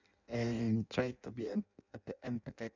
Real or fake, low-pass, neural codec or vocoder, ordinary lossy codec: fake; 7.2 kHz; codec, 16 kHz in and 24 kHz out, 0.6 kbps, FireRedTTS-2 codec; none